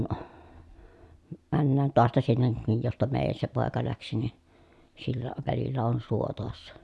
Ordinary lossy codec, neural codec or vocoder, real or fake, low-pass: none; none; real; none